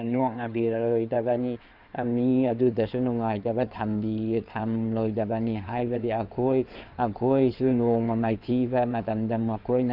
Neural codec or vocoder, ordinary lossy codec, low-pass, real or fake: codec, 16 kHz in and 24 kHz out, 2.2 kbps, FireRedTTS-2 codec; none; 5.4 kHz; fake